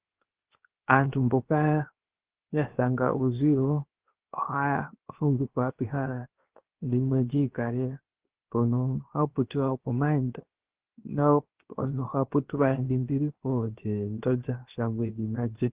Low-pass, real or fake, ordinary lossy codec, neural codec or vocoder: 3.6 kHz; fake; Opus, 16 kbps; codec, 16 kHz, 0.7 kbps, FocalCodec